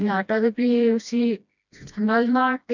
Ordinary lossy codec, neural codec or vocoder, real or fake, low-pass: none; codec, 16 kHz, 1 kbps, FreqCodec, smaller model; fake; 7.2 kHz